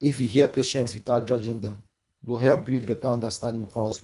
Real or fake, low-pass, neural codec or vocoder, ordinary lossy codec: fake; 10.8 kHz; codec, 24 kHz, 1.5 kbps, HILCodec; none